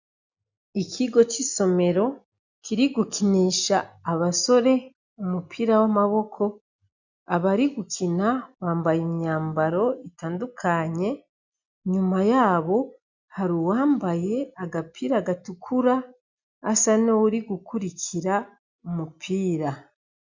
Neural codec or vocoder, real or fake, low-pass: none; real; 7.2 kHz